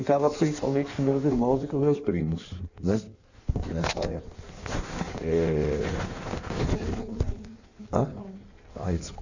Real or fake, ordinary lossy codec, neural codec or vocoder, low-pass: fake; none; codec, 16 kHz in and 24 kHz out, 1.1 kbps, FireRedTTS-2 codec; 7.2 kHz